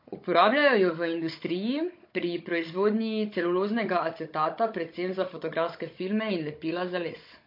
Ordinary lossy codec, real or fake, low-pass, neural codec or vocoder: MP3, 32 kbps; fake; 5.4 kHz; codec, 16 kHz, 16 kbps, FunCodec, trained on Chinese and English, 50 frames a second